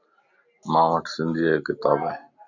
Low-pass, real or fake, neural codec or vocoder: 7.2 kHz; real; none